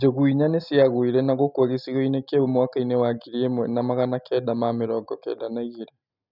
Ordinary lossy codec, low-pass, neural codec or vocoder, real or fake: none; 5.4 kHz; none; real